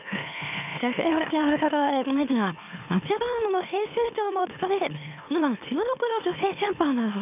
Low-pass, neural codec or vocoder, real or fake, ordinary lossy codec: 3.6 kHz; autoencoder, 44.1 kHz, a latent of 192 numbers a frame, MeloTTS; fake; none